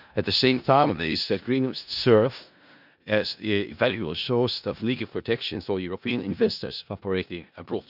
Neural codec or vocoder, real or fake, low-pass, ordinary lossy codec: codec, 16 kHz in and 24 kHz out, 0.4 kbps, LongCat-Audio-Codec, four codebook decoder; fake; 5.4 kHz; none